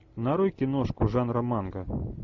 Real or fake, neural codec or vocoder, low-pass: real; none; 7.2 kHz